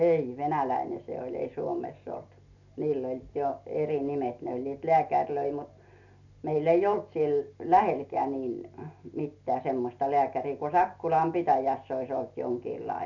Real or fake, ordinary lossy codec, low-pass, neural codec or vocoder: real; none; 7.2 kHz; none